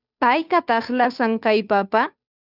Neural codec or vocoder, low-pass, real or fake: codec, 16 kHz, 2 kbps, FunCodec, trained on Chinese and English, 25 frames a second; 5.4 kHz; fake